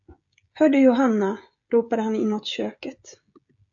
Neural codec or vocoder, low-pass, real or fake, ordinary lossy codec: codec, 16 kHz, 16 kbps, FreqCodec, smaller model; 7.2 kHz; fake; AAC, 64 kbps